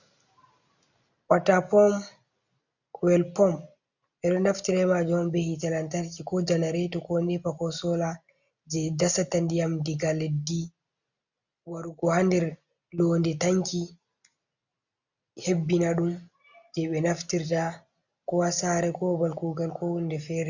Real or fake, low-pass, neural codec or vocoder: real; 7.2 kHz; none